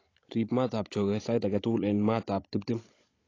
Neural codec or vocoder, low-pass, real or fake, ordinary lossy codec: codec, 16 kHz, 16 kbps, FunCodec, trained on Chinese and English, 50 frames a second; 7.2 kHz; fake; AAC, 32 kbps